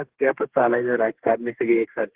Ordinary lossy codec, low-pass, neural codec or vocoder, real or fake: Opus, 16 kbps; 3.6 kHz; codec, 32 kHz, 1.9 kbps, SNAC; fake